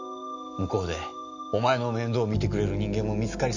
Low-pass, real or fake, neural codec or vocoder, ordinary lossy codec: 7.2 kHz; real; none; none